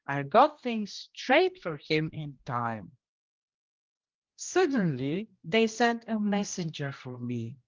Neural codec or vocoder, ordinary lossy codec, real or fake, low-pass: codec, 16 kHz, 1 kbps, X-Codec, HuBERT features, trained on general audio; Opus, 32 kbps; fake; 7.2 kHz